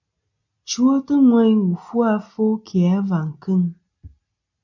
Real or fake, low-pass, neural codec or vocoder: real; 7.2 kHz; none